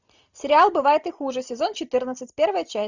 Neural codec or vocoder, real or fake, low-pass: none; real; 7.2 kHz